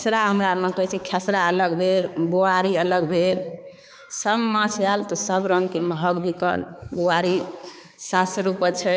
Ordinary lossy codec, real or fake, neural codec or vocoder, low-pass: none; fake; codec, 16 kHz, 4 kbps, X-Codec, HuBERT features, trained on balanced general audio; none